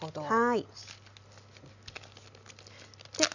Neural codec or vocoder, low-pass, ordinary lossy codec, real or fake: none; 7.2 kHz; none; real